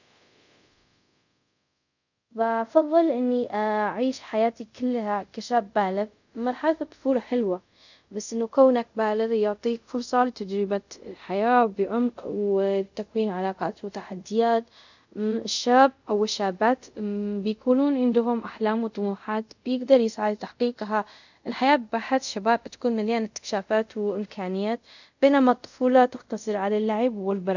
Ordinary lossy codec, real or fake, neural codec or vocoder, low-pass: none; fake; codec, 24 kHz, 0.5 kbps, DualCodec; 7.2 kHz